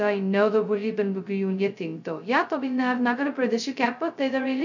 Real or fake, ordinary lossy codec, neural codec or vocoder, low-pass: fake; none; codec, 16 kHz, 0.2 kbps, FocalCodec; 7.2 kHz